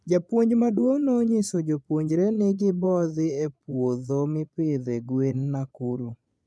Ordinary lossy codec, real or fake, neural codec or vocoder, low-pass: none; fake; vocoder, 22.05 kHz, 80 mel bands, Vocos; none